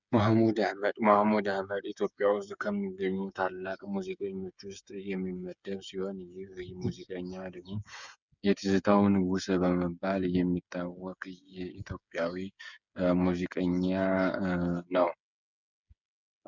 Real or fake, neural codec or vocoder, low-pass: fake; codec, 16 kHz, 8 kbps, FreqCodec, smaller model; 7.2 kHz